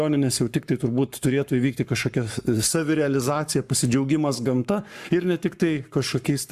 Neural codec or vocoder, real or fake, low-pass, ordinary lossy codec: codec, 44.1 kHz, 7.8 kbps, Pupu-Codec; fake; 14.4 kHz; AAC, 96 kbps